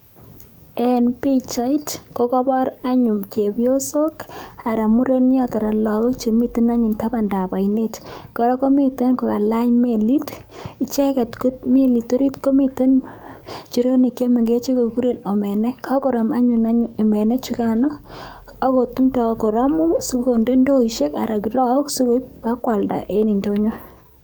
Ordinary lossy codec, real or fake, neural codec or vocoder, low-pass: none; fake; codec, 44.1 kHz, 7.8 kbps, DAC; none